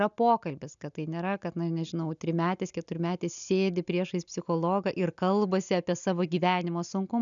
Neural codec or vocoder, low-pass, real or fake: none; 7.2 kHz; real